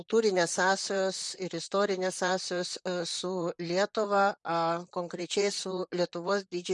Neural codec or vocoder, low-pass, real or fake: vocoder, 44.1 kHz, 128 mel bands, Pupu-Vocoder; 10.8 kHz; fake